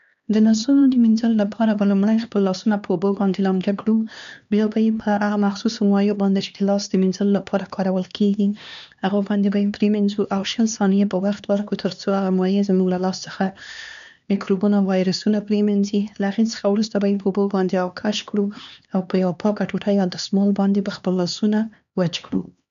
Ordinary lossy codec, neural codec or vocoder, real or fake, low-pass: MP3, 96 kbps; codec, 16 kHz, 2 kbps, X-Codec, HuBERT features, trained on LibriSpeech; fake; 7.2 kHz